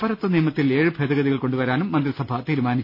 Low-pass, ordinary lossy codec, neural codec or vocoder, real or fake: 5.4 kHz; none; none; real